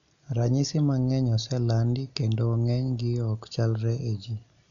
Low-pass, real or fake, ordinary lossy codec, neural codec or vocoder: 7.2 kHz; real; none; none